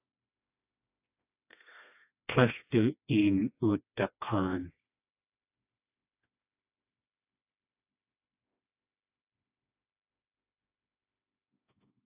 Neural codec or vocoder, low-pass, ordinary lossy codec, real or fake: codec, 16 kHz, 2 kbps, FreqCodec, smaller model; 3.6 kHz; AAC, 32 kbps; fake